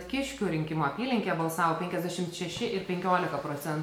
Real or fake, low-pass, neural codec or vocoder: real; 19.8 kHz; none